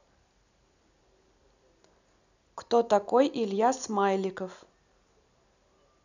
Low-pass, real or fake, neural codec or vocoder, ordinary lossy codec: 7.2 kHz; real; none; none